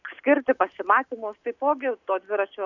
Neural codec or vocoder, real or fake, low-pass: none; real; 7.2 kHz